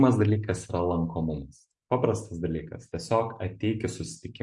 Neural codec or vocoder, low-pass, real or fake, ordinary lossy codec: none; 10.8 kHz; real; MP3, 64 kbps